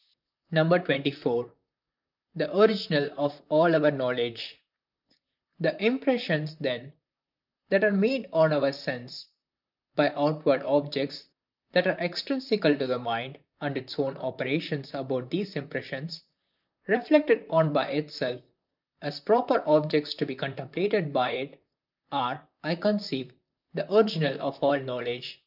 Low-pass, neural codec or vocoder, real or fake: 5.4 kHz; vocoder, 44.1 kHz, 128 mel bands, Pupu-Vocoder; fake